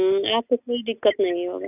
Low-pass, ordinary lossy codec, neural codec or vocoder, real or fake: 3.6 kHz; none; none; real